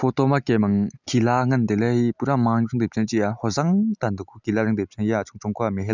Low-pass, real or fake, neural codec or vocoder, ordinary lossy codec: 7.2 kHz; real; none; none